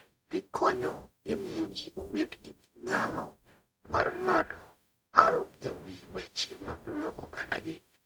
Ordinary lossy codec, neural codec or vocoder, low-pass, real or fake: none; codec, 44.1 kHz, 0.9 kbps, DAC; none; fake